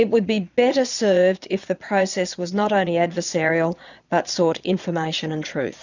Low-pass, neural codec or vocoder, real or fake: 7.2 kHz; vocoder, 22.05 kHz, 80 mel bands, Vocos; fake